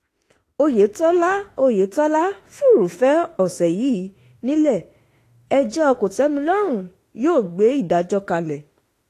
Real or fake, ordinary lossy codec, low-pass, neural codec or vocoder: fake; AAC, 48 kbps; 14.4 kHz; autoencoder, 48 kHz, 32 numbers a frame, DAC-VAE, trained on Japanese speech